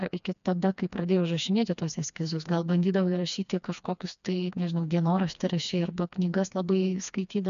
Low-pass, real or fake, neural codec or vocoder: 7.2 kHz; fake; codec, 16 kHz, 2 kbps, FreqCodec, smaller model